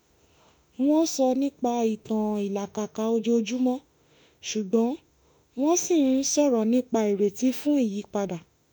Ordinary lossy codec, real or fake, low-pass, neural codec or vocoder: none; fake; none; autoencoder, 48 kHz, 32 numbers a frame, DAC-VAE, trained on Japanese speech